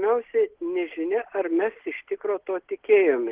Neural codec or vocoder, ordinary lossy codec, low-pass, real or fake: none; Opus, 16 kbps; 3.6 kHz; real